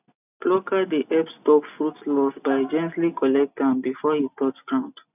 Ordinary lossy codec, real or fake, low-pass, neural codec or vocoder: none; real; 3.6 kHz; none